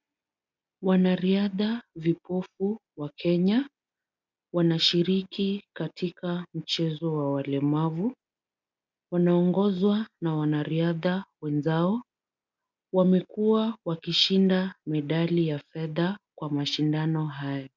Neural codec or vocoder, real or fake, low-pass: none; real; 7.2 kHz